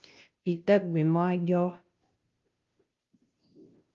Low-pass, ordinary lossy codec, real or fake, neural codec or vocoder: 7.2 kHz; Opus, 32 kbps; fake; codec, 16 kHz, 0.5 kbps, FunCodec, trained on Chinese and English, 25 frames a second